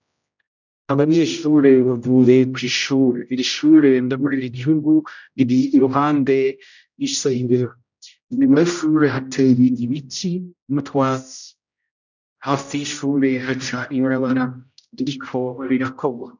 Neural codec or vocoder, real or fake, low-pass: codec, 16 kHz, 0.5 kbps, X-Codec, HuBERT features, trained on general audio; fake; 7.2 kHz